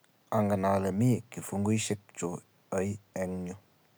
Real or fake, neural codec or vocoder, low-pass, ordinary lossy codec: real; none; none; none